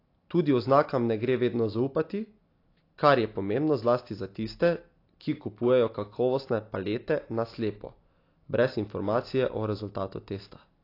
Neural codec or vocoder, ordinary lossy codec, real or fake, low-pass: none; AAC, 32 kbps; real; 5.4 kHz